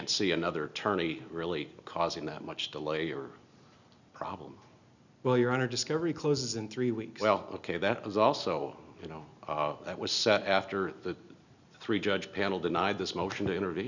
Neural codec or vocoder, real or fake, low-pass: none; real; 7.2 kHz